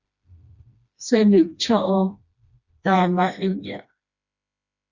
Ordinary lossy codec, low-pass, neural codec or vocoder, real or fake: Opus, 64 kbps; 7.2 kHz; codec, 16 kHz, 1 kbps, FreqCodec, smaller model; fake